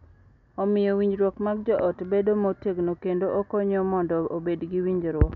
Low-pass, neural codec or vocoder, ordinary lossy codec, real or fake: 7.2 kHz; none; none; real